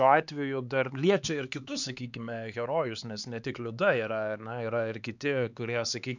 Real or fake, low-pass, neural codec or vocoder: fake; 7.2 kHz; codec, 16 kHz, 4 kbps, X-Codec, HuBERT features, trained on LibriSpeech